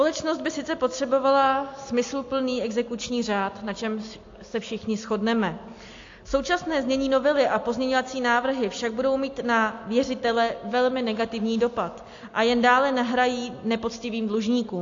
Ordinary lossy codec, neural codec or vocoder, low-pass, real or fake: AAC, 48 kbps; none; 7.2 kHz; real